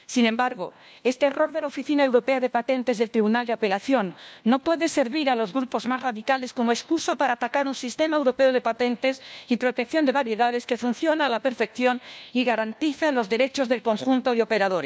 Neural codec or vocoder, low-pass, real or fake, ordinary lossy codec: codec, 16 kHz, 1 kbps, FunCodec, trained on LibriTTS, 50 frames a second; none; fake; none